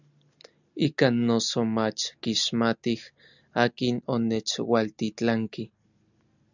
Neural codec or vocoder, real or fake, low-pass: none; real; 7.2 kHz